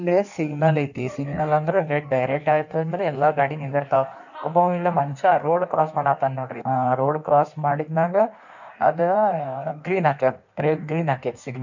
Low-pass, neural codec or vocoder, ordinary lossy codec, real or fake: 7.2 kHz; codec, 16 kHz in and 24 kHz out, 1.1 kbps, FireRedTTS-2 codec; none; fake